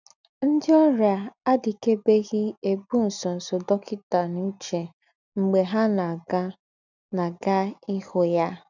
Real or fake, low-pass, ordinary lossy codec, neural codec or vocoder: real; 7.2 kHz; none; none